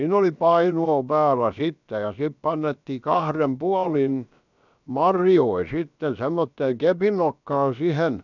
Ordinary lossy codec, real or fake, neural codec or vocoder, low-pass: none; fake; codec, 16 kHz, about 1 kbps, DyCAST, with the encoder's durations; 7.2 kHz